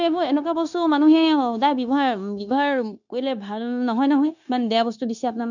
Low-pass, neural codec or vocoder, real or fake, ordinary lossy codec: 7.2 kHz; codec, 16 kHz, 0.9 kbps, LongCat-Audio-Codec; fake; none